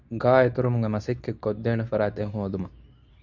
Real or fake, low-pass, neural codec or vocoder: fake; 7.2 kHz; codec, 24 kHz, 0.9 kbps, WavTokenizer, medium speech release version 2